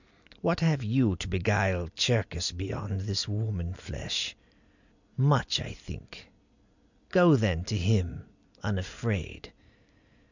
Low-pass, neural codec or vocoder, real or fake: 7.2 kHz; none; real